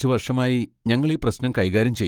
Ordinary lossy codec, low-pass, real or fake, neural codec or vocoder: Opus, 24 kbps; 19.8 kHz; fake; codec, 44.1 kHz, 7.8 kbps, Pupu-Codec